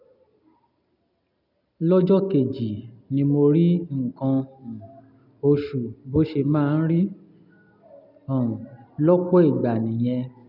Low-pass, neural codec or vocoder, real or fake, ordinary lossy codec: 5.4 kHz; none; real; none